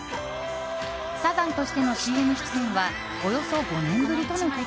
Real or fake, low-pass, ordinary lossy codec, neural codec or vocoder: real; none; none; none